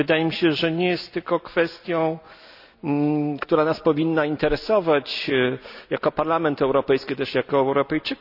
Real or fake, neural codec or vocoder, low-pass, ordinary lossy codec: real; none; 5.4 kHz; none